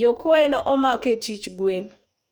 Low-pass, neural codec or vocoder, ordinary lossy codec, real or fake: none; codec, 44.1 kHz, 2.6 kbps, DAC; none; fake